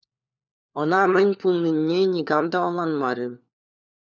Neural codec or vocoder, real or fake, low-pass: codec, 16 kHz, 4 kbps, FunCodec, trained on LibriTTS, 50 frames a second; fake; 7.2 kHz